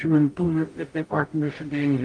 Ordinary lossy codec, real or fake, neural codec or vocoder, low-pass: Opus, 24 kbps; fake; codec, 44.1 kHz, 0.9 kbps, DAC; 9.9 kHz